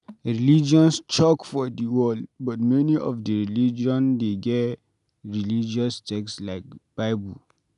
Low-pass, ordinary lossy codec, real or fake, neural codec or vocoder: 10.8 kHz; none; real; none